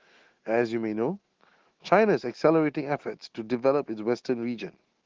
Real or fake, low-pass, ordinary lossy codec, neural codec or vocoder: fake; 7.2 kHz; Opus, 16 kbps; autoencoder, 48 kHz, 128 numbers a frame, DAC-VAE, trained on Japanese speech